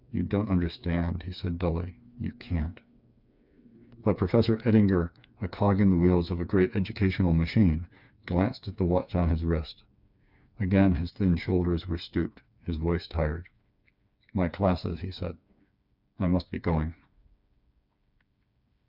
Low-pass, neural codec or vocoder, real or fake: 5.4 kHz; codec, 16 kHz, 4 kbps, FreqCodec, smaller model; fake